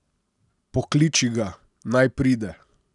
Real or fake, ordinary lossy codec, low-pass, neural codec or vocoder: real; none; 10.8 kHz; none